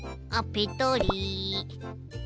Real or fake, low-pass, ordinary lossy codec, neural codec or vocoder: real; none; none; none